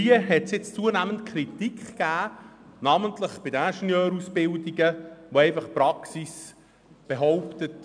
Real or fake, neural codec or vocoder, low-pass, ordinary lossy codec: real; none; 9.9 kHz; none